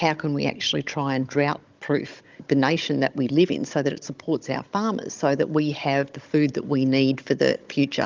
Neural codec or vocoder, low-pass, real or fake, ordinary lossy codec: codec, 16 kHz, 16 kbps, FunCodec, trained on Chinese and English, 50 frames a second; 7.2 kHz; fake; Opus, 32 kbps